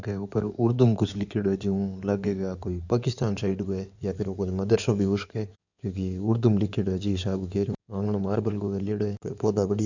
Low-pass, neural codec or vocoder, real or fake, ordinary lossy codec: 7.2 kHz; codec, 16 kHz in and 24 kHz out, 2.2 kbps, FireRedTTS-2 codec; fake; none